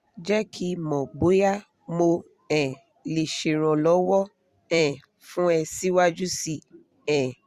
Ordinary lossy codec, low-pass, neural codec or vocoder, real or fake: Opus, 64 kbps; 14.4 kHz; vocoder, 44.1 kHz, 128 mel bands every 256 samples, BigVGAN v2; fake